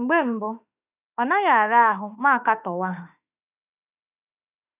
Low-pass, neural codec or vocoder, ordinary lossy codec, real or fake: 3.6 kHz; autoencoder, 48 kHz, 32 numbers a frame, DAC-VAE, trained on Japanese speech; none; fake